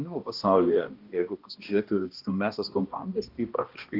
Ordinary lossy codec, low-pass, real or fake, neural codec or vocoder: Opus, 24 kbps; 5.4 kHz; fake; codec, 16 kHz, 1 kbps, X-Codec, HuBERT features, trained on balanced general audio